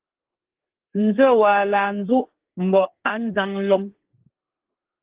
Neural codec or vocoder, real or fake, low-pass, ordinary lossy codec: codec, 32 kHz, 1.9 kbps, SNAC; fake; 3.6 kHz; Opus, 16 kbps